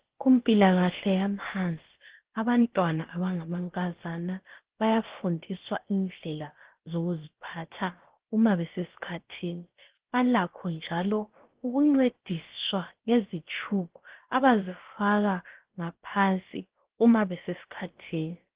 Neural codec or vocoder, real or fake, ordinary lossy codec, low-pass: codec, 16 kHz, about 1 kbps, DyCAST, with the encoder's durations; fake; Opus, 16 kbps; 3.6 kHz